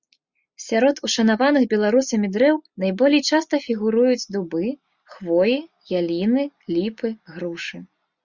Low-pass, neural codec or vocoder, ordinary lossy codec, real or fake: 7.2 kHz; none; Opus, 64 kbps; real